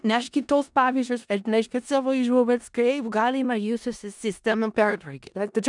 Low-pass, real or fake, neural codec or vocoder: 10.8 kHz; fake; codec, 16 kHz in and 24 kHz out, 0.4 kbps, LongCat-Audio-Codec, four codebook decoder